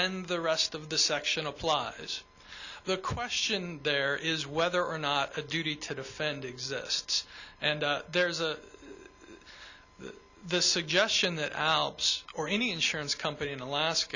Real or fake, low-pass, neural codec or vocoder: real; 7.2 kHz; none